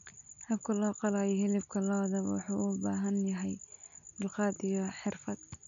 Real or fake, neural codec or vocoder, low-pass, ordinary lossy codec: real; none; 7.2 kHz; none